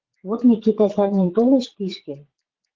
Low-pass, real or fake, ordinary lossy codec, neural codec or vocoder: 7.2 kHz; fake; Opus, 16 kbps; vocoder, 22.05 kHz, 80 mel bands, Vocos